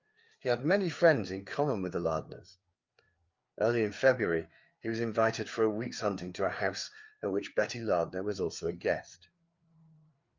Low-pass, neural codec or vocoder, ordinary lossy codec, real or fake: 7.2 kHz; codec, 16 kHz, 4 kbps, FreqCodec, larger model; Opus, 24 kbps; fake